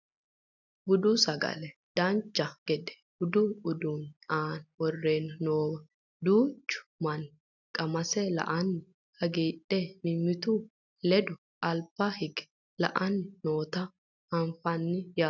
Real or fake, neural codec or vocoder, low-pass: real; none; 7.2 kHz